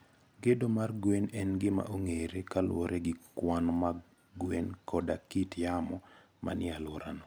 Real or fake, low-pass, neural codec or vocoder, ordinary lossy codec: real; none; none; none